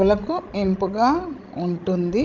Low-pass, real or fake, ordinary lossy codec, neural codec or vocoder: 7.2 kHz; fake; Opus, 24 kbps; codec, 16 kHz, 16 kbps, FreqCodec, larger model